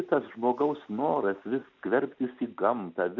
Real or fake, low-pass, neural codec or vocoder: real; 7.2 kHz; none